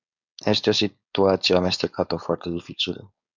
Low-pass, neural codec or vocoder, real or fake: 7.2 kHz; codec, 16 kHz, 4.8 kbps, FACodec; fake